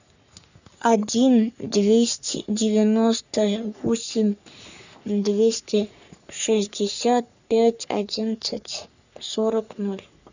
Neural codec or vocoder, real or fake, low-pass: codec, 44.1 kHz, 3.4 kbps, Pupu-Codec; fake; 7.2 kHz